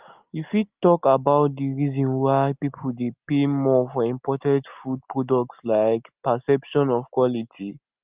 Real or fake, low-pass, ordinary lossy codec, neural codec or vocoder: real; 3.6 kHz; Opus, 32 kbps; none